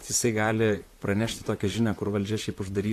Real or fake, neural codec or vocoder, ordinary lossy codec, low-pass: fake; vocoder, 44.1 kHz, 128 mel bands, Pupu-Vocoder; AAC, 64 kbps; 14.4 kHz